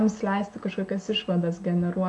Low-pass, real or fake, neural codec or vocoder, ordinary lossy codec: 10.8 kHz; real; none; AAC, 64 kbps